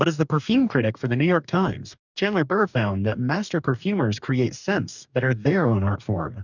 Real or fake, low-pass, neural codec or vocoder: fake; 7.2 kHz; codec, 44.1 kHz, 2.6 kbps, DAC